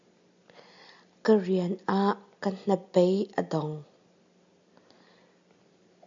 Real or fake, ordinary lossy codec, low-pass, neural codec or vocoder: real; AAC, 64 kbps; 7.2 kHz; none